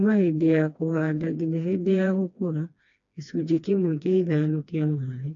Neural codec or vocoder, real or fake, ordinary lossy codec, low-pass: codec, 16 kHz, 2 kbps, FreqCodec, smaller model; fake; AAC, 64 kbps; 7.2 kHz